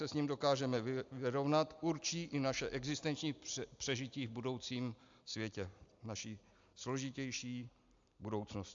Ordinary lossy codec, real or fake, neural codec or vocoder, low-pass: AAC, 64 kbps; real; none; 7.2 kHz